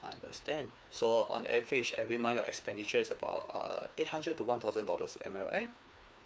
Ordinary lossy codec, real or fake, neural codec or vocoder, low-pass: none; fake; codec, 16 kHz, 2 kbps, FreqCodec, larger model; none